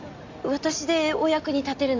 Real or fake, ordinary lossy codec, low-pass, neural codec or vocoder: real; AAC, 48 kbps; 7.2 kHz; none